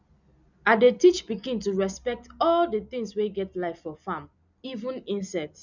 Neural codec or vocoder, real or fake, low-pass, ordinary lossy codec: none; real; 7.2 kHz; none